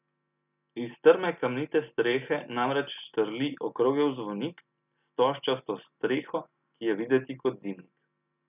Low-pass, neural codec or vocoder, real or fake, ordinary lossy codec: 3.6 kHz; none; real; none